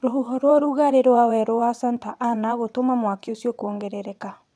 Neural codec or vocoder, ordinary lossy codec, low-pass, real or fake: vocoder, 22.05 kHz, 80 mel bands, WaveNeXt; none; none; fake